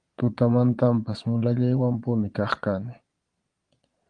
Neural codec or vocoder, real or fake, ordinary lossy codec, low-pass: vocoder, 22.05 kHz, 80 mel bands, Vocos; fake; Opus, 24 kbps; 9.9 kHz